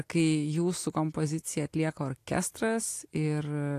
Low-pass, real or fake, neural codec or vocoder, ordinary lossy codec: 14.4 kHz; real; none; AAC, 64 kbps